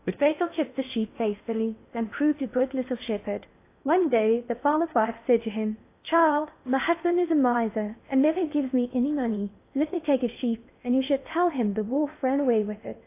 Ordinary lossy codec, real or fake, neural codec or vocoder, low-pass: AAC, 24 kbps; fake; codec, 16 kHz in and 24 kHz out, 0.6 kbps, FocalCodec, streaming, 4096 codes; 3.6 kHz